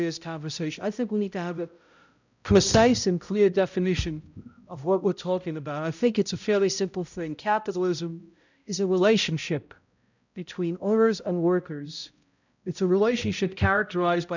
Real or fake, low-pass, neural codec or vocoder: fake; 7.2 kHz; codec, 16 kHz, 0.5 kbps, X-Codec, HuBERT features, trained on balanced general audio